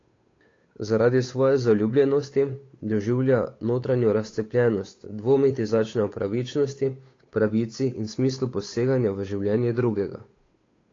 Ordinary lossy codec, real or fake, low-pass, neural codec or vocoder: AAC, 32 kbps; fake; 7.2 kHz; codec, 16 kHz, 8 kbps, FunCodec, trained on Chinese and English, 25 frames a second